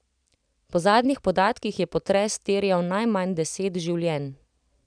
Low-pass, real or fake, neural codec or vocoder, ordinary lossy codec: 9.9 kHz; real; none; none